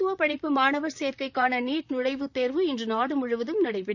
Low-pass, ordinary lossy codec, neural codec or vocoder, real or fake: 7.2 kHz; none; vocoder, 44.1 kHz, 128 mel bands, Pupu-Vocoder; fake